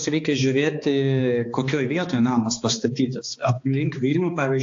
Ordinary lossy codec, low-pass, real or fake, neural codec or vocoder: AAC, 48 kbps; 7.2 kHz; fake; codec, 16 kHz, 2 kbps, X-Codec, HuBERT features, trained on balanced general audio